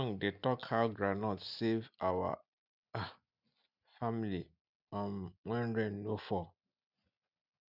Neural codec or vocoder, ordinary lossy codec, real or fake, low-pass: none; none; real; 5.4 kHz